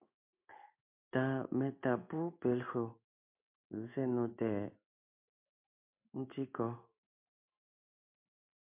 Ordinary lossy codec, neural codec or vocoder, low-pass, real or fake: MP3, 32 kbps; codec, 16 kHz in and 24 kHz out, 1 kbps, XY-Tokenizer; 3.6 kHz; fake